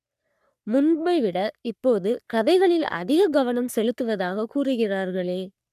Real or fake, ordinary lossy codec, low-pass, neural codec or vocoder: fake; none; 14.4 kHz; codec, 44.1 kHz, 3.4 kbps, Pupu-Codec